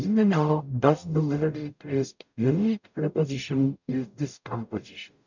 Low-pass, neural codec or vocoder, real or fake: 7.2 kHz; codec, 44.1 kHz, 0.9 kbps, DAC; fake